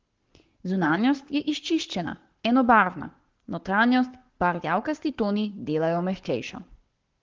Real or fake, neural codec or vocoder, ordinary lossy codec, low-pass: fake; codec, 44.1 kHz, 7.8 kbps, Pupu-Codec; Opus, 16 kbps; 7.2 kHz